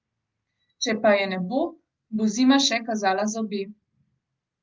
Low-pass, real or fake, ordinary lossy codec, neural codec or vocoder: 7.2 kHz; real; Opus, 32 kbps; none